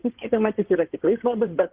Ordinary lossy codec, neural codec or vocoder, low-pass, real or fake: Opus, 16 kbps; codec, 16 kHz in and 24 kHz out, 2.2 kbps, FireRedTTS-2 codec; 3.6 kHz; fake